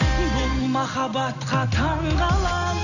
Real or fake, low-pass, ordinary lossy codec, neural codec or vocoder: real; 7.2 kHz; none; none